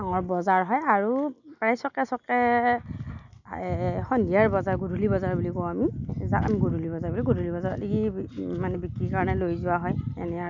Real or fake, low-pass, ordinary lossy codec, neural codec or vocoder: real; 7.2 kHz; none; none